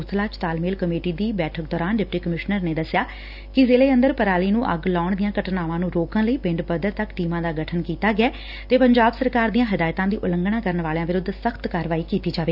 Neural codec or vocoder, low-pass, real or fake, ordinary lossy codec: none; 5.4 kHz; real; none